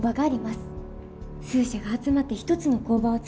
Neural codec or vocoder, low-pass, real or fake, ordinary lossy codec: none; none; real; none